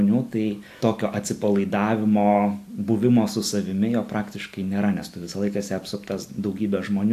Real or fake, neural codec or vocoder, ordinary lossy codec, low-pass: real; none; AAC, 64 kbps; 14.4 kHz